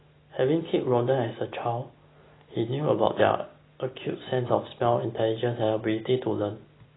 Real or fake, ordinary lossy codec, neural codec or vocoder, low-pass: real; AAC, 16 kbps; none; 7.2 kHz